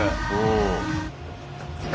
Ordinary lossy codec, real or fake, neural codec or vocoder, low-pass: none; real; none; none